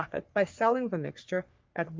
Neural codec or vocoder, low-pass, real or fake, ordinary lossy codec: codec, 44.1 kHz, 3.4 kbps, Pupu-Codec; 7.2 kHz; fake; Opus, 32 kbps